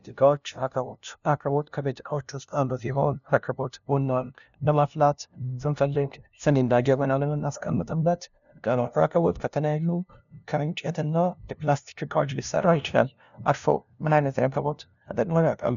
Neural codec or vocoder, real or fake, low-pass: codec, 16 kHz, 0.5 kbps, FunCodec, trained on LibriTTS, 25 frames a second; fake; 7.2 kHz